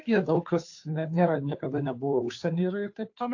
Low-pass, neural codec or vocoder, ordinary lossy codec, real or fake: 7.2 kHz; codec, 16 kHz, 2 kbps, FunCodec, trained on Chinese and English, 25 frames a second; AAC, 48 kbps; fake